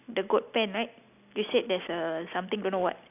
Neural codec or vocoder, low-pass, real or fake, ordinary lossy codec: none; 3.6 kHz; real; none